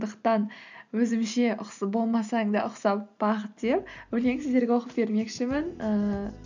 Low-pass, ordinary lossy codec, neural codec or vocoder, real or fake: 7.2 kHz; none; none; real